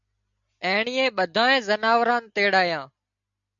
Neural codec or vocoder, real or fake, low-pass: none; real; 7.2 kHz